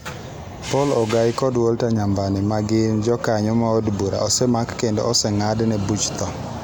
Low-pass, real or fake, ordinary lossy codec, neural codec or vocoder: none; real; none; none